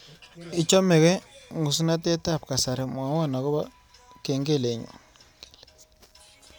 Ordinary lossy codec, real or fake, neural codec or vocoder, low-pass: none; real; none; none